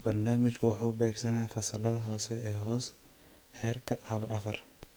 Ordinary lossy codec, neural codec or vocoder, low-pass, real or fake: none; codec, 44.1 kHz, 2.6 kbps, SNAC; none; fake